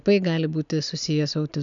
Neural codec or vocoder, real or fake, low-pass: none; real; 7.2 kHz